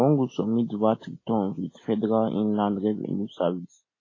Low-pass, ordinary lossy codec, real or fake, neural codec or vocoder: 7.2 kHz; AAC, 32 kbps; real; none